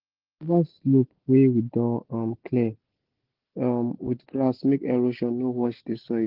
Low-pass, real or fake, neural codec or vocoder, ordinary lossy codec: 5.4 kHz; real; none; Opus, 16 kbps